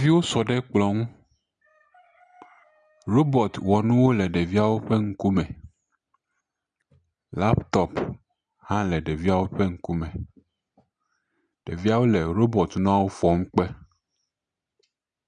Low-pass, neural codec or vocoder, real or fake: 9.9 kHz; none; real